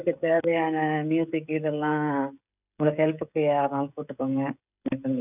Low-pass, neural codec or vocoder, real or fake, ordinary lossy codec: 3.6 kHz; codec, 16 kHz, 16 kbps, FreqCodec, smaller model; fake; none